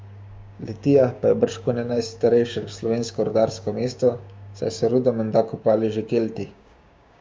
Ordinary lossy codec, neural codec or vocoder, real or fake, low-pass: none; codec, 16 kHz, 6 kbps, DAC; fake; none